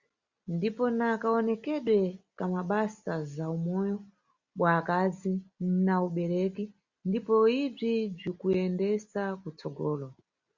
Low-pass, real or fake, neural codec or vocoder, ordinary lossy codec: 7.2 kHz; real; none; Opus, 64 kbps